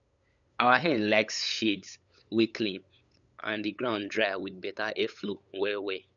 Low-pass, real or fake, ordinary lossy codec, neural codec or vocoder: 7.2 kHz; fake; none; codec, 16 kHz, 8 kbps, FunCodec, trained on LibriTTS, 25 frames a second